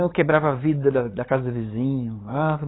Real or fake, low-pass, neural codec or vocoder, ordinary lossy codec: fake; 7.2 kHz; codec, 16 kHz, 8 kbps, FunCodec, trained on LibriTTS, 25 frames a second; AAC, 16 kbps